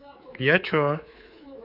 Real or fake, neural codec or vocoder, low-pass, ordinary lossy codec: fake; codec, 24 kHz, 3.1 kbps, DualCodec; 5.4 kHz; none